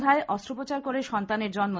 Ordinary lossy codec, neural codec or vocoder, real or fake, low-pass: none; none; real; none